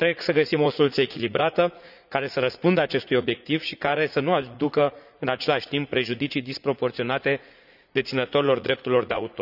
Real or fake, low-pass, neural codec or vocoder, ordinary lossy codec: fake; 5.4 kHz; vocoder, 44.1 kHz, 80 mel bands, Vocos; none